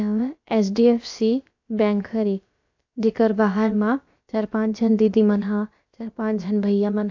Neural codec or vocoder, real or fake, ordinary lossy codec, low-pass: codec, 16 kHz, about 1 kbps, DyCAST, with the encoder's durations; fake; AAC, 48 kbps; 7.2 kHz